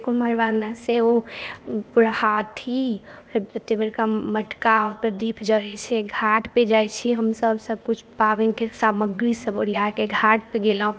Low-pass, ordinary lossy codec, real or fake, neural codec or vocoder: none; none; fake; codec, 16 kHz, 0.8 kbps, ZipCodec